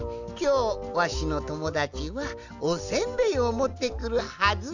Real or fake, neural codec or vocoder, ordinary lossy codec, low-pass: real; none; none; 7.2 kHz